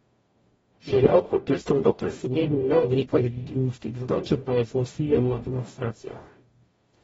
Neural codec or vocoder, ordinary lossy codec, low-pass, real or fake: codec, 44.1 kHz, 0.9 kbps, DAC; AAC, 24 kbps; 19.8 kHz; fake